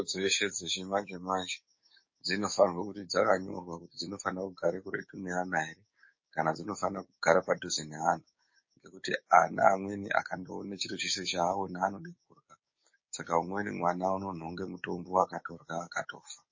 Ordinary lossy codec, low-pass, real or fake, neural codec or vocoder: MP3, 32 kbps; 7.2 kHz; fake; codec, 16 kHz, 6 kbps, DAC